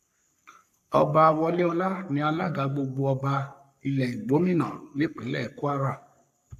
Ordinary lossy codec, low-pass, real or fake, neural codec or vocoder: AAC, 96 kbps; 14.4 kHz; fake; codec, 44.1 kHz, 3.4 kbps, Pupu-Codec